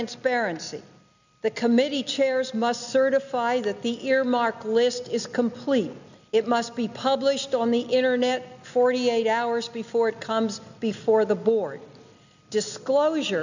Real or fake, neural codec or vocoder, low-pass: real; none; 7.2 kHz